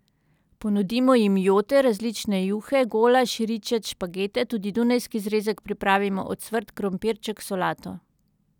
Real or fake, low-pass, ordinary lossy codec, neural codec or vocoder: real; 19.8 kHz; none; none